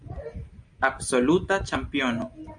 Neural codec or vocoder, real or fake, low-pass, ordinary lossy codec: none; real; 9.9 kHz; MP3, 64 kbps